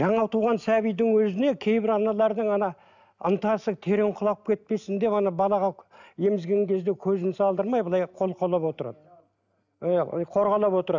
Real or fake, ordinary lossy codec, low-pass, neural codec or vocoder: real; none; 7.2 kHz; none